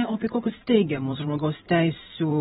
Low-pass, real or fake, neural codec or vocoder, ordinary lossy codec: 19.8 kHz; fake; vocoder, 44.1 kHz, 128 mel bands every 512 samples, BigVGAN v2; AAC, 16 kbps